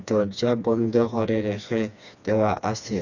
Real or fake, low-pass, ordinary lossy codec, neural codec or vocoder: fake; 7.2 kHz; none; codec, 16 kHz, 2 kbps, FreqCodec, smaller model